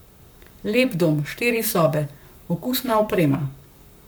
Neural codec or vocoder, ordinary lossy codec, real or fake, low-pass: codec, 44.1 kHz, 7.8 kbps, Pupu-Codec; none; fake; none